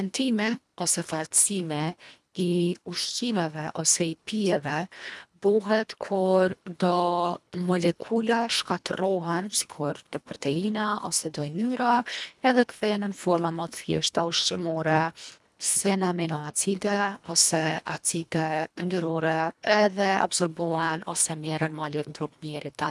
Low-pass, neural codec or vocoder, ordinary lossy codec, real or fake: none; codec, 24 kHz, 1.5 kbps, HILCodec; none; fake